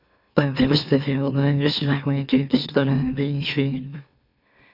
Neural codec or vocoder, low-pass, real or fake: autoencoder, 44.1 kHz, a latent of 192 numbers a frame, MeloTTS; 5.4 kHz; fake